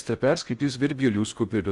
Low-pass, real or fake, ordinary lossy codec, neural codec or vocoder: 10.8 kHz; fake; Opus, 64 kbps; codec, 16 kHz in and 24 kHz out, 0.6 kbps, FocalCodec, streaming, 4096 codes